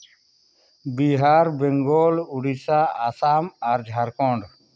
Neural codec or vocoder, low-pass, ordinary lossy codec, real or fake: none; none; none; real